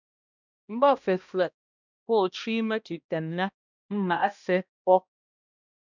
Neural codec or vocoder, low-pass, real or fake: codec, 16 kHz, 1 kbps, X-Codec, HuBERT features, trained on balanced general audio; 7.2 kHz; fake